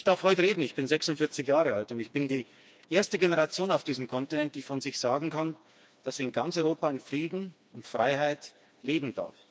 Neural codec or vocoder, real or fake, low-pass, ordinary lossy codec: codec, 16 kHz, 2 kbps, FreqCodec, smaller model; fake; none; none